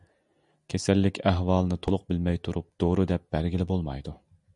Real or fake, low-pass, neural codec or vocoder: real; 10.8 kHz; none